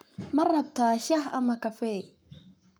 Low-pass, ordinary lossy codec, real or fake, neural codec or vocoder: none; none; fake; codec, 44.1 kHz, 7.8 kbps, Pupu-Codec